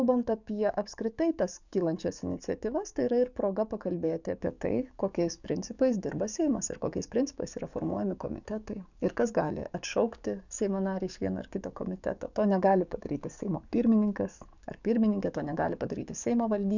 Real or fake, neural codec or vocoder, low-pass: fake; codec, 44.1 kHz, 7.8 kbps, DAC; 7.2 kHz